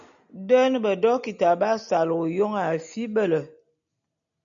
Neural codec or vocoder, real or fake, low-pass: none; real; 7.2 kHz